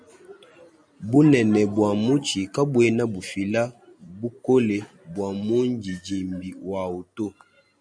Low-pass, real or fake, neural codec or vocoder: 9.9 kHz; real; none